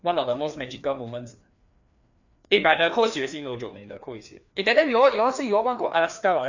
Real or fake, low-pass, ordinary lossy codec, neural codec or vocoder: fake; 7.2 kHz; none; codec, 16 kHz, 2 kbps, FreqCodec, larger model